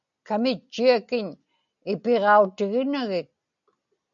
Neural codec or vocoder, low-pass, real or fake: none; 7.2 kHz; real